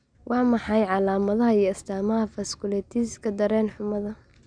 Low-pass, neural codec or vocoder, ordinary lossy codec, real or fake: 9.9 kHz; none; none; real